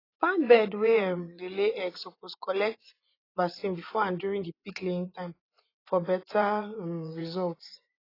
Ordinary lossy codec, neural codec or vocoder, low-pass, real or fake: AAC, 24 kbps; vocoder, 24 kHz, 100 mel bands, Vocos; 5.4 kHz; fake